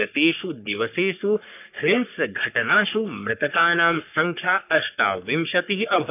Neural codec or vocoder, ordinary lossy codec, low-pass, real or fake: codec, 44.1 kHz, 3.4 kbps, Pupu-Codec; none; 3.6 kHz; fake